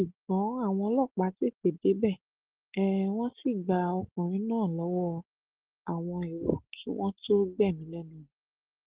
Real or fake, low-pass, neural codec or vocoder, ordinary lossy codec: real; 3.6 kHz; none; Opus, 16 kbps